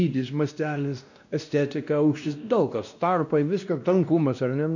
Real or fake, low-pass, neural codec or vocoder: fake; 7.2 kHz; codec, 16 kHz, 1 kbps, X-Codec, WavLM features, trained on Multilingual LibriSpeech